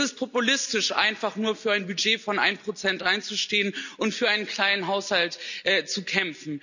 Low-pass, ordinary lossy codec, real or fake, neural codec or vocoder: 7.2 kHz; none; real; none